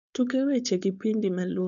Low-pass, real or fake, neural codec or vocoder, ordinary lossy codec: 7.2 kHz; fake; codec, 16 kHz, 4.8 kbps, FACodec; none